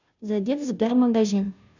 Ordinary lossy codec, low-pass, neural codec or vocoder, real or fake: none; 7.2 kHz; codec, 16 kHz, 0.5 kbps, FunCodec, trained on Chinese and English, 25 frames a second; fake